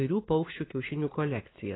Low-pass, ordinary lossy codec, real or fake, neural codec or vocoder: 7.2 kHz; AAC, 16 kbps; fake; vocoder, 44.1 kHz, 128 mel bands every 512 samples, BigVGAN v2